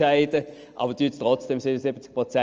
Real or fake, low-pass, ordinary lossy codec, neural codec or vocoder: real; 7.2 kHz; Opus, 24 kbps; none